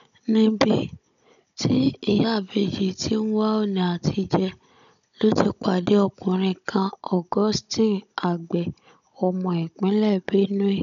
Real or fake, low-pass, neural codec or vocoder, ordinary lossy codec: fake; 7.2 kHz; codec, 16 kHz, 16 kbps, FunCodec, trained on Chinese and English, 50 frames a second; none